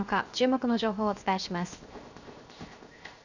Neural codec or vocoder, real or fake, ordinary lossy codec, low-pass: codec, 16 kHz, 0.7 kbps, FocalCodec; fake; none; 7.2 kHz